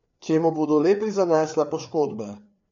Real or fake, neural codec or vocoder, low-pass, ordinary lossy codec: fake; codec, 16 kHz, 4 kbps, FreqCodec, larger model; 7.2 kHz; MP3, 48 kbps